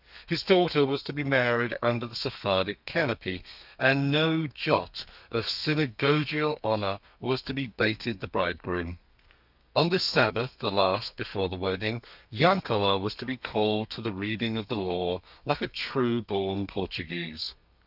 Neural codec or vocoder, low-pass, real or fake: codec, 32 kHz, 1.9 kbps, SNAC; 5.4 kHz; fake